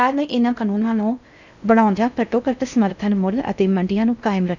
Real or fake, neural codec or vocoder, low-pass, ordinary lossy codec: fake; codec, 16 kHz in and 24 kHz out, 0.6 kbps, FocalCodec, streaming, 2048 codes; 7.2 kHz; none